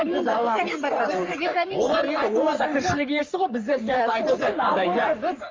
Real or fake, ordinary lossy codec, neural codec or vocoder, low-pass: fake; Opus, 32 kbps; codec, 44.1 kHz, 3.4 kbps, Pupu-Codec; 7.2 kHz